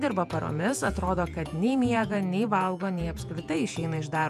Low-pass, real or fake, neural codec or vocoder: 14.4 kHz; fake; vocoder, 48 kHz, 128 mel bands, Vocos